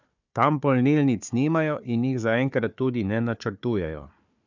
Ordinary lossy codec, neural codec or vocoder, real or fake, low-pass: none; codec, 16 kHz, 4 kbps, FunCodec, trained on Chinese and English, 50 frames a second; fake; 7.2 kHz